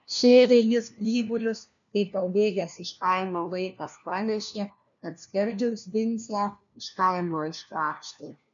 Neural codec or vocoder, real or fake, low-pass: codec, 16 kHz, 1 kbps, FunCodec, trained on LibriTTS, 50 frames a second; fake; 7.2 kHz